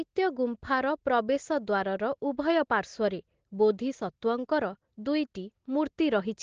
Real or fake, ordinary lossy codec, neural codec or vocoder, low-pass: real; Opus, 16 kbps; none; 7.2 kHz